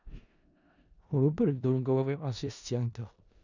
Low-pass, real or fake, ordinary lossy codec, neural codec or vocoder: 7.2 kHz; fake; none; codec, 16 kHz in and 24 kHz out, 0.4 kbps, LongCat-Audio-Codec, four codebook decoder